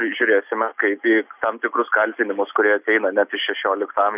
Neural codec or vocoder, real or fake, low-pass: none; real; 3.6 kHz